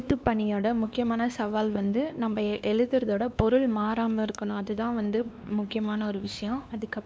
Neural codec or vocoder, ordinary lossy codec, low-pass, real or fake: codec, 16 kHz, 2 kbps, X-Codec, WavLM features, trained on Multilingual LibriSpeech; none; none; fake